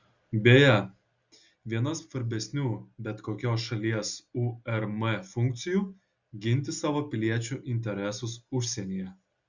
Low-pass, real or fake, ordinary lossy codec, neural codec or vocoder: 7.2 kHz; real; Opus, 64 kbps; none